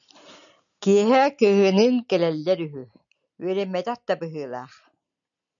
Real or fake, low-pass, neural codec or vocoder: real; 7.2 kHz; none